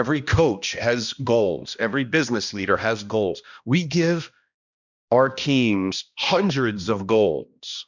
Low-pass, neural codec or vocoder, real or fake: 7.2 kHz; codec, 16 kHz, 1 kbps, X-Codec, HuBERT features, trained on balanced general audio; fake